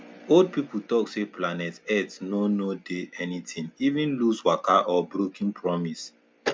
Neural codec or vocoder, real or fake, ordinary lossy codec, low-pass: none; real; none; none